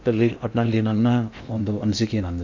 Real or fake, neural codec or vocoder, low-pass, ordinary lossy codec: fake; codec, 16 kHz in and 24 kHz out, 0.8 kbps, FocalCodec, streaming, 65536 codes; 7.2 kHz; MP3, 64 kbps